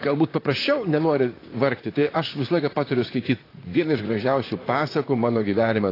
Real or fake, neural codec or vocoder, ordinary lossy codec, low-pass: fake; vocoder, 44.1 kHz, 128 mel bands, Pupu-Vocoder; AAC, 24 kbps; 5.4 kHz